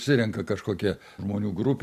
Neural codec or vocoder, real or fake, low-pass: none; real; 14.4 kHz